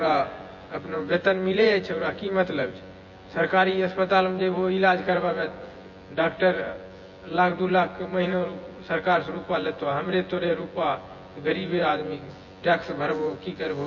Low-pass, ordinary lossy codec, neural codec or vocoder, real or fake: 7.2 kHz; MP3, 32 kbps; vocoder, 24 kHz, 100 mel bands, Vocos; fake